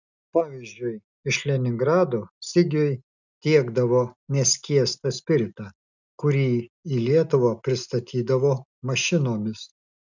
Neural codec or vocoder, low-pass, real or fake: none; 7.2 kHz; real